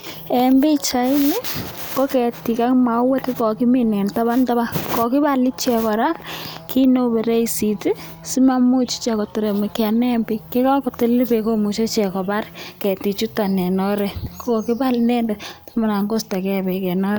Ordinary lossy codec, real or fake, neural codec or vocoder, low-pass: none; real; none; none